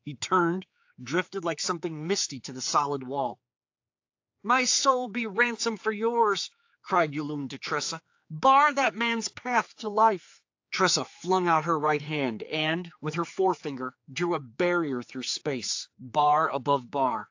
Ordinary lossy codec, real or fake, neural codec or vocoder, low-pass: AAC, 48 kbps; fake; codec, 16 kHz, 4 kbps, X-Codec, HuBERT features, trained on general audio; 7.2 kHz